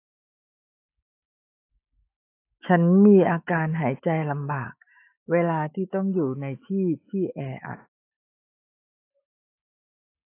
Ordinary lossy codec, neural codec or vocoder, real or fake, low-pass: AAC, 24 kbps; autoencoder, 48 kHz, 128 numbers a frame, DAC-VAE, trained on Japanese speech; fake; 3.6 kHz